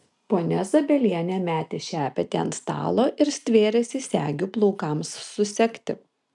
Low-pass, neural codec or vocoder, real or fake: 10.8 kHz; none; real